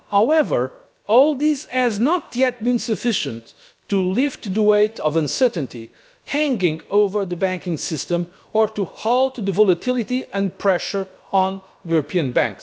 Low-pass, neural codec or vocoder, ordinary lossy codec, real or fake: none; codec, 16 kHz, about 1 kbps, DyCAST, with the encoder's durations; none; fake